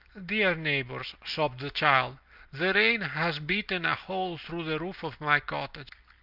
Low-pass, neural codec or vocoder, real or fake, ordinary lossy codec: 5.4 kHz; none; real; Opus, 24 kbps